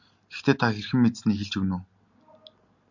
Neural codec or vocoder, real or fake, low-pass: none; real; 7.2 kHz